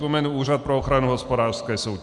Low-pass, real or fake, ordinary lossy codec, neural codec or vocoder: 10.8 kHz; real; MP3, 96 kbps; none